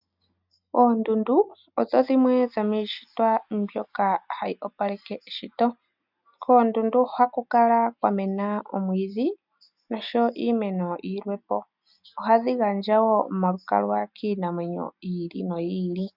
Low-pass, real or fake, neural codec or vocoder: 5.4 kHz; real; none